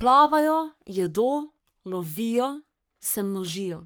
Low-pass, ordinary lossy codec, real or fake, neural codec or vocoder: none; none; fake; codec, 44.1 kHz, 3.4 kbps, Pupu-Codec